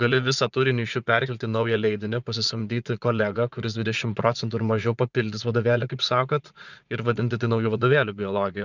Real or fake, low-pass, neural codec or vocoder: fake; 7.2 kHz; vocoder, 44.1 kHz, 80 mel bands, Vocos